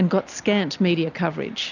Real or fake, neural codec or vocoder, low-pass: real; none; 7.2 kHz